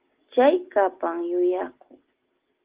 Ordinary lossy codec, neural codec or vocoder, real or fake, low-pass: Opus, 16 kbps; none; real; 3.6 kHz